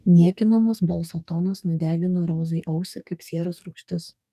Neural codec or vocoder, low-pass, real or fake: codec, 44.1 kHz, 2.6 kbps, DAC; 14.4 kHz; fake